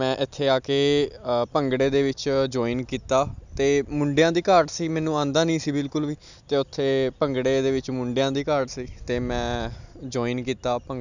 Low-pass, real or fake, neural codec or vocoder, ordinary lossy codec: 7.2 kHz; real; none; none